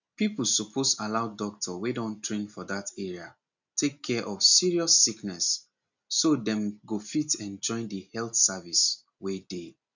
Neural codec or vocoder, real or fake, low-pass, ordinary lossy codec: none; real; 7.2 kHz; none